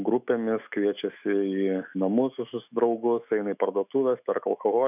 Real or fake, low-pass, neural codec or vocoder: fake; 3.6 kHz; autoencoder, 48 kHz, 128 numbers a frame, DAC-VAE, trained on Japanese speech